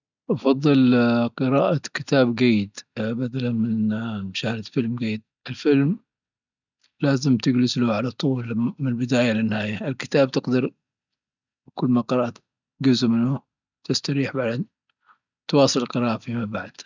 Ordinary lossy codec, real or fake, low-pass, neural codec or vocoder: none; real; 7.2 kHz; none